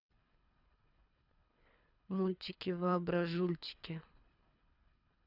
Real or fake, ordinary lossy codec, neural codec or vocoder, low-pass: fake; none; codec, 24 kHz, 3 kbps, HILCodec; 5.4 kHz